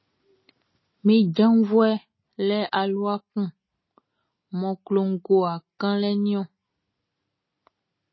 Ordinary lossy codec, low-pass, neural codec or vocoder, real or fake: MP3, 24 kbps; 7.2 kHz; none; real